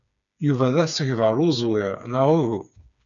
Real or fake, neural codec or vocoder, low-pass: fake; codec, 16 kHz, 4 kbps, FreqCodec, smaller model; 7.2 kHz